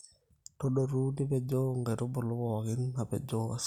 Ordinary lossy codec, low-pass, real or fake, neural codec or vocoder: none; 19.8 kHz; real; none